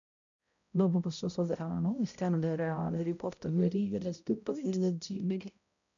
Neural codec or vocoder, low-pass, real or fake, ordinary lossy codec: codec, 16 kHz, 0.5 kbps, X-Codec, HuBERT features, trained on balanced general audio; 7.2 kHz; fake; MP3, 96 kbps